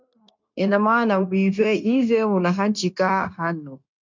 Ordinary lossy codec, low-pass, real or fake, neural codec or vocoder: MP3, 64 kbps; 7.2 kHz; fake; codec, 16 kHz, 0.9 kbps, LongCat-Audio-Codec